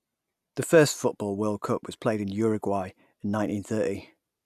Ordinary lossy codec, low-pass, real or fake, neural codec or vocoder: none; 14.4 kHz; real; none